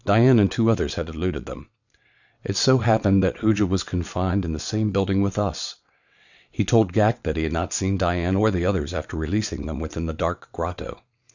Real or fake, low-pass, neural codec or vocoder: fake; 7.2 kHz; vocoder, 22.05 kHz, 80 mel bands, WaveNeXt